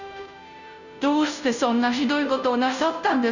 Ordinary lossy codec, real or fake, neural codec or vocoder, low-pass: none; fake; codec, 16 kHz, 0.5 kbps, FunCodec, trained on Chinese and English, 25 frames a second; 7.2 kHz